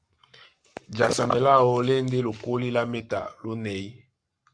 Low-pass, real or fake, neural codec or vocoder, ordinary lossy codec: 9.9 kHz; fake; codec, 44.1 kHz, 7.8 kbps, Pupu-Codec; Opus, 64 kbps